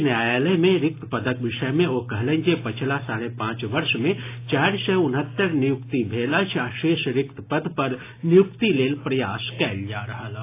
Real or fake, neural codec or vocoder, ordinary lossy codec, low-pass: real; none; AAC, 24 kbps; 3.6 kHz